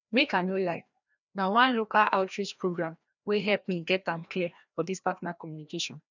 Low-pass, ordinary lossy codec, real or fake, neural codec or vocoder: 7.2 kHz; none; fake; codec, 16 kHz, 1 kbps, FreqCodec, larger model